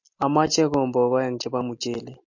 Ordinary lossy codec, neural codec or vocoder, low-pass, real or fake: MP3, 48 kbps; none; 7.2 kHz; real